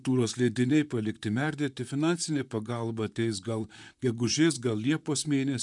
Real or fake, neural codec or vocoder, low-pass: fake; vocoder, 44.1 kHz, 128 mel bands, Pupu-Vocoder; 10.8 kHz